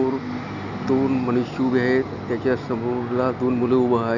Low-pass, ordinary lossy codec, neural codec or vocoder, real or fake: 7.2 kHz; none; none; real